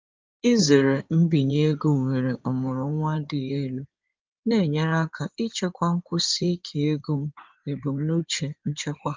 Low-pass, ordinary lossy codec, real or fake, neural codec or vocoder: 7.2 kHz; Opus, 24 kbps; fake; codec, 16 kHz in and 24 kHz out, 2.2 kbps, FireRedTTS-2 codec